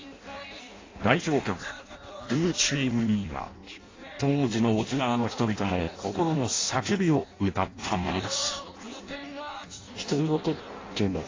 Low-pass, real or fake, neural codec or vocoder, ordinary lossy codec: 7.2 kHz; fake; codec, 16 kHz in and 24 kHz out, 0.6 kbps, FireRedTTS-2 codec; AAC, 32 kbps